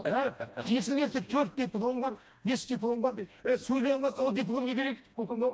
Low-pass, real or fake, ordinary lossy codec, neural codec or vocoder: none; fake; none; codec, 16 kHz, 1 kbps, FreqCodec, smaller model